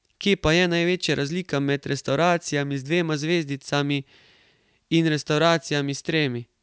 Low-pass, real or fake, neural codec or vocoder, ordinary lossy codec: none; real; none; none